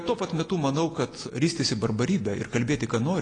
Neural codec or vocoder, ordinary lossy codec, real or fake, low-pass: none; AAC, 32 kbps; real; 9.9 kHz